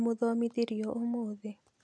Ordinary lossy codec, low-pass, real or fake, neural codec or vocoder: none; none; real; none